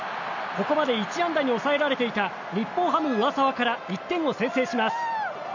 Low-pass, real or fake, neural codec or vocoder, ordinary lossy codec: 7.2 kHz; real; none; none